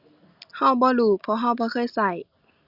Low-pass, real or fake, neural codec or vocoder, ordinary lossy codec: 5.4 kHz; real; none; Opus, 64 kbps